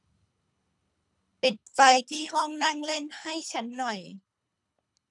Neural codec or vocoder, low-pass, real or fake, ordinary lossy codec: codec, 24 kHz, 3 kbps, HILCodec; 10.8 kHz; fake; none